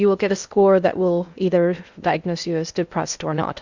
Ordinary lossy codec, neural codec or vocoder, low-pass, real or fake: Opus, 64 kbps; codec, 16 kHz in and 24 kHz out, 0.6 kbps, FocalCodec, streaming, 2048 codes; 7.2 kHz; fake